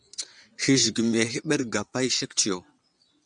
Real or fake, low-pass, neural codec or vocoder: fake; 9.9 kHz; vocoder, 22.05 kHz, 80 mel bands, WaveNeXt